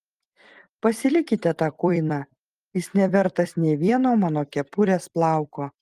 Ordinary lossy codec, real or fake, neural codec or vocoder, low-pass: Opus, 24 kbps; fake; vocoder, 44.1 kHz, 128 mel bands every 256 samples, BigVGAN v2; 14.4 kHz